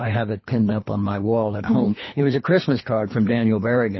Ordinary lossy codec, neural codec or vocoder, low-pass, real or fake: MP3, 24 kbps; codec, 24 kHz, 3 kbps, HILCodec; 7.2 kHz; fake